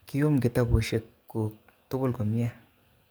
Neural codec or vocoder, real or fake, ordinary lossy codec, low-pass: codec, 44.1 kHz, 7.8 kbps, DAC; fake; none; none